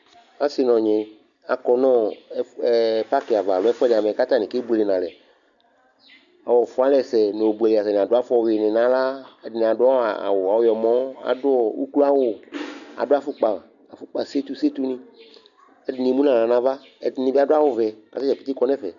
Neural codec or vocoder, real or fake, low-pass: none; real; 7.2 kHz